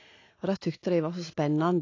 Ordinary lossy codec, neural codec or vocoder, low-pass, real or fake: AAC, 32 kbps; none; 7.2 kHz; real